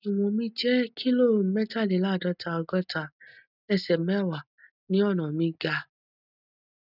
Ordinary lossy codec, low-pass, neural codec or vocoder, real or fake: none; 5.4 kHz; none; real